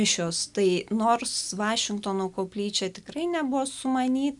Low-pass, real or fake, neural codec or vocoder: 10.8 kHz; real; none